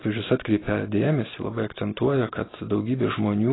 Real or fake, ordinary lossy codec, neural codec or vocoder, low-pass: real; AAC, 16 kbps; none; 7.2 kHz